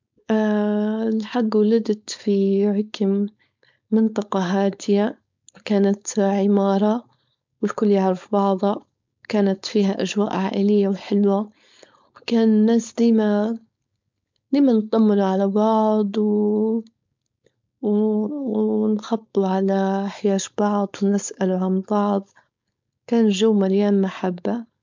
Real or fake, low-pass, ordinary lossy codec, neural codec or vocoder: fake; 7.2 kHz; MP3, 64 kbps; codec, 16 kHz, 4.8 kbps, FACodec